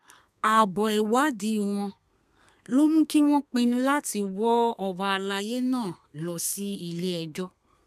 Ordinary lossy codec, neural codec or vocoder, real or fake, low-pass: none; codec, 32 kHz, 1.9 kbps, SNAC; fake; 14.4 kHz